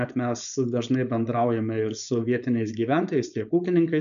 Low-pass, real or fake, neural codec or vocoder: 7.2 kHz; fake; codec, 16 kHz, 4.8 kbps, FACodec